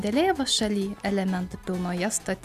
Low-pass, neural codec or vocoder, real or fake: 14.4 kHz; none; real